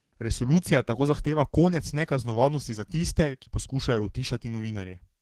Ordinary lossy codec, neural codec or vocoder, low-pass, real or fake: Opus, 16 kbps; codec, 32 kHz, 1.9 kbps, SNAC; 14.4 kHz; fake